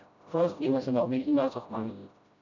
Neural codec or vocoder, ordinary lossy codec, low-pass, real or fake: codec, 16 kHz, 0.5 kbps, FreqCodec, smaller model; none; 7.2 kHz; fake